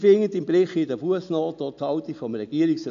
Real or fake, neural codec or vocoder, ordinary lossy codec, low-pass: real; none; MP3, 64 kbps; 7.2 kHz